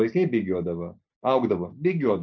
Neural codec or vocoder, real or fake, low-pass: none; real; 7.2 kHz